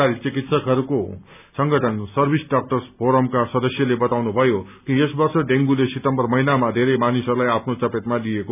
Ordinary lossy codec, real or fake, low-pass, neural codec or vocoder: none; real; 3.6 kHz; none